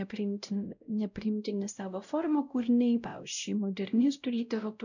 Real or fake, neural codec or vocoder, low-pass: fake; codec, 16 kHz, 0.5 kbps, X-Codec, WavLM features, trained on Multilingual LibriSpeech; 7.2 kHz